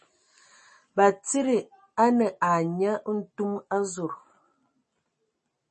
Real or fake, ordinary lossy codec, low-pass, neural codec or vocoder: real; MP3, 32 kbps; 10.8 kHz; none